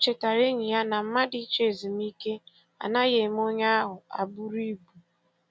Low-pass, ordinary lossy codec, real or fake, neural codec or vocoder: none; none; real; none